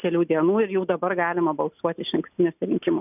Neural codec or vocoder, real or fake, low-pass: none; real; 3.6 kHz